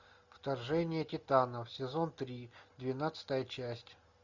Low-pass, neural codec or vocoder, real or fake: 7.2 kHz; none; real